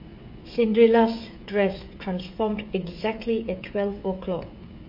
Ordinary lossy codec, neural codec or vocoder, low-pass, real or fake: MP3, 32 kbps; codec, 16 kHz, 16 kbps, FreqCodec, smaller model; 5.4 kHz; fake